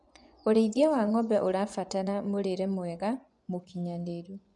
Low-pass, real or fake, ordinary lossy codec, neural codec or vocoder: 10.8 kHz; fake; none; vocoder, 24 kHz, 100 mel bands, Vocos